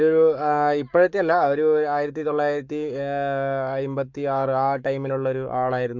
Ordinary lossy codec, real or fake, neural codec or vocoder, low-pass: MP3, 64 kbps; fake; codec, 44.1 kHz, 7.8 kbps, Pupu-Codec; 7.2 kHz